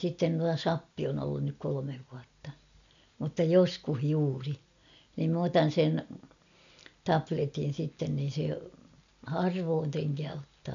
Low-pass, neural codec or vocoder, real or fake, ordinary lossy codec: 7.2 kHz; none; real; none